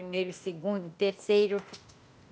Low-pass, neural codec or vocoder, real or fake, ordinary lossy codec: none; codec, 16 kHz, 0.8 kbps, ZipCodec; fake; none